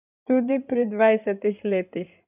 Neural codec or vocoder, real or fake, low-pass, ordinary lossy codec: codec, 16 kHz in and 24 kHz out, 2.2 kbps, FireRedTTS-2 codec; fake; 3.6 kHz; none